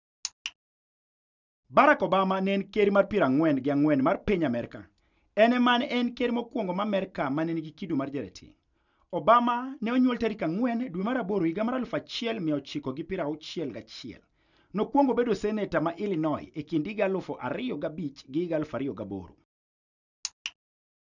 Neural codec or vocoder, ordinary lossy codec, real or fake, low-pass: none; none; real; 7.2 kHz